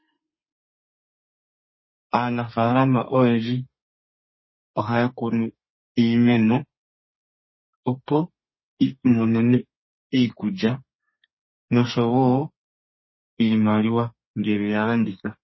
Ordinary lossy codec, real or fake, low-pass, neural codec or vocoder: MP3, 24 kbps; fake; 7.2 kHz; codec, 32 kHz, 1.9 kbps, SNAC